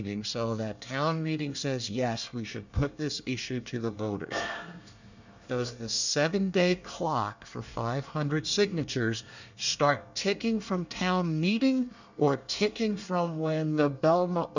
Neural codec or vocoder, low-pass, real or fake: codec, 24 kHz, 1 kbps, SNAC; 7.2 kHz; fake